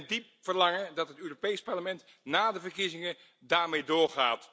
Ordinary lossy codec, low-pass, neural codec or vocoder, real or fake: none; none; none; real